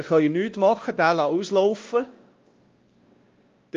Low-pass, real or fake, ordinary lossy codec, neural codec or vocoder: 7.2 kHz; fake; Opus, 32 kbps; codec, 16 kHz, 1 kbps, X-Codec, WavLM features, trained on Multilingual LibriSpeech